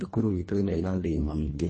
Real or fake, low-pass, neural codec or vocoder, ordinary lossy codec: fake; 10.8 kHz; codec, 24 kHz, 1.5 kbps, HILCodec; MP3, 32 kbps